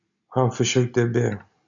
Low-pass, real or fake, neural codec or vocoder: 7.2 kHz; real; none